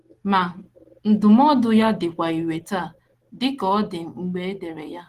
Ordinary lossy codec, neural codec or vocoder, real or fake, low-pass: Opus, 16 kbps; none; real; 14.4 kHz